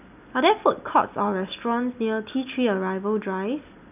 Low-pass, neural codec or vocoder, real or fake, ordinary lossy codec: 3.6 kHz; none; real; none